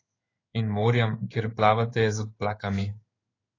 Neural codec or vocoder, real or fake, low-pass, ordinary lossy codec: codec, 16 kHz in and 24 kHz out, 1 kbps, XY-Tokenizer; fake; 7.2 kHz; AAC, 32 kbps